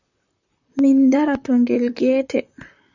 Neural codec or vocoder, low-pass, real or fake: vocoder, 44.1 kHz, 128 mel bands, Pupu-Vocoder; 7.2 kHz; fake